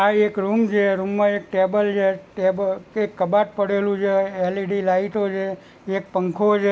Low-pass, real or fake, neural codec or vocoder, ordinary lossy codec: none; real; none; none